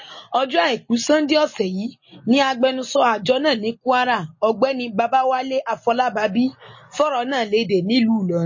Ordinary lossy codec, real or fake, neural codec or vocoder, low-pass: MP3, 32 kbps; real; none; 7.2 kHz